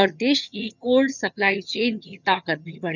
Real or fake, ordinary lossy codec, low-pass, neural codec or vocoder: fake; none; 7.2 kHz; vocoder, 22.05 kHz, 80 mel bands, HiFi-GAN